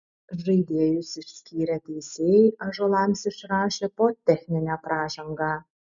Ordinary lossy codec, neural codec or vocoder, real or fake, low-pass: MP3, 96 kbps; none; real; 7.2 kHz